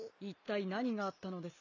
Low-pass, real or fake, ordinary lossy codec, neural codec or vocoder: 7.2 kHz; real; none; none